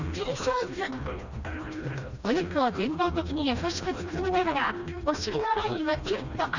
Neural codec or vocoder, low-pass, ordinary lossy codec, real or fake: codec, 16 kHz, 1 kbps, FreqCodec, smaller model; 7.2 kHz; none; fake